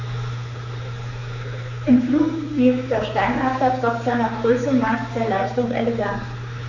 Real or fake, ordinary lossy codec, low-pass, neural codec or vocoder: fake; Opus, 64 kbps; 7.2 kHz; codec, 16 kHz, 4 kbps, X-Codec, HuBERT features, trained on general audio